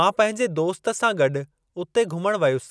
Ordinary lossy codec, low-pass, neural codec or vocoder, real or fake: none; none; none; real